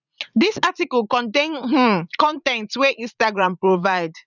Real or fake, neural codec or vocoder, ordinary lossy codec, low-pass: fake; vocoder, 44.1 kHz, 80 mel bands, Vocos; none; 7.2 kHz